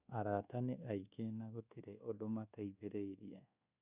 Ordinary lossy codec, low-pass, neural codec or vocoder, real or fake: Opus, 64 kbps; 3.6 kHz; codec, 24 kHz, 1.2 kbps, DualCodec; fake